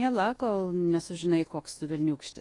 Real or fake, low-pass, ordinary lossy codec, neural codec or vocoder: fake; 10.8 kHz; AAC, 32 kbps; codec, 24 kHz, 1.2 kbps, DualCodec